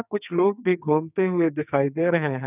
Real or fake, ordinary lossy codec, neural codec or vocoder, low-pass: fake; none; codec, 44.1 kHz, 2.6 kbps, SNAC; 3.6 kHz